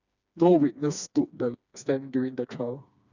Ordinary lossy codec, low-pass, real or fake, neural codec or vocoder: none; 7.2 kHz; fake; codec, 16 kHz, 2 kbps, FreqCodec, smaller model